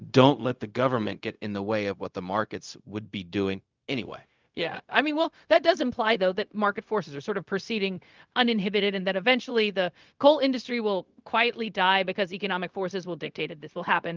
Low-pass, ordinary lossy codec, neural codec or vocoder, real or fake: 7.2 kHz; Opus, 24 kbps; codec, 16 kHz, 0.4 kbps, LongCat-Audio-Codec; fake